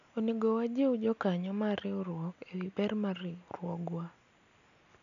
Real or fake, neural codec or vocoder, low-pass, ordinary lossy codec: real; none; 7.2 kHz; none